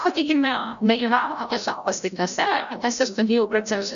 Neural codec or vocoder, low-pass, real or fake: codec, 16 kHz, 0.5 kbps, FreqCodec, larger model; 7.2 kHz; fake